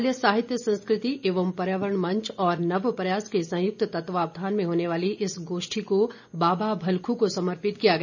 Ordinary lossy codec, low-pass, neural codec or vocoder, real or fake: none; 7.2 kHz; none; real